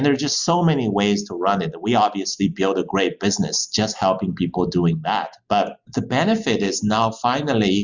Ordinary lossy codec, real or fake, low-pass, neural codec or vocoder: Opus, 64 kbps; real; 7.2 kHz; none